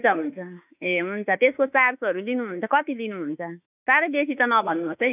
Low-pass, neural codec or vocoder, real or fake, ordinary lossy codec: 3.6 kHz; autoencoder, 48 kHz, 32 numbers a frame, DAC-VAE, trained on Japanese speech; fake; none